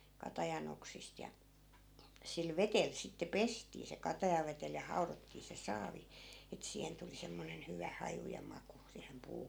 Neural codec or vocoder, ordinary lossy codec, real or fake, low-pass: none; none; real; none